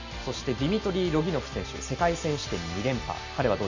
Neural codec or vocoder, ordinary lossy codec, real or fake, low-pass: none; none; real; 7.2 kHz